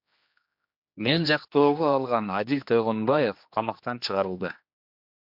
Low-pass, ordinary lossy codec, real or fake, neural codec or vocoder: 5.4 kHz; MP3, 48 kbps; fake; codec, 16 kHz, 2 kbps, X-Codec, HuBERT features, trained on general audio